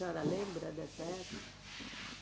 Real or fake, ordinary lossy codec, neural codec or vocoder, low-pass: real; none; none; none